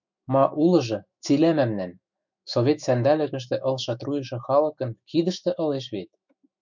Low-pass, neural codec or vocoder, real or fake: 7.2 kHz; autoencoder, 48 kHz, 128 numbers a frame, DAC-VAE, trained on Japanese speech; fake